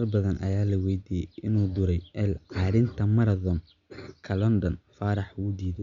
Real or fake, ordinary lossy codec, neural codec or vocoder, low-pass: real; Opus, 64 kbps; none; 7.2 kHz